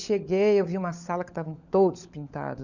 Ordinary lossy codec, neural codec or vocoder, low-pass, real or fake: none; codec, 16 kHz, 16 kbps, FunCodec, trained on LibriTTS, 50 frames a second; 7.2 kHz; fake